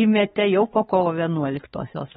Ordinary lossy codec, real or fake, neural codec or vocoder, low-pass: AAC, 16 kbps; fake; codec, 16 kHz, 4 kbps, X-Codec, HuBERT features, trained on balanced general audio; 7.2 kHz